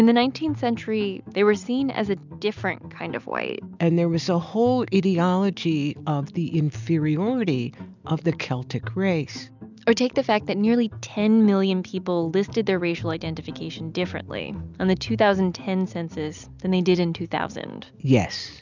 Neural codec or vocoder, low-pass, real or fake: none; 7.2 kHz; real